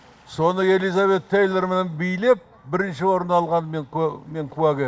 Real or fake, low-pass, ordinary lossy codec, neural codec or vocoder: real; none; none; none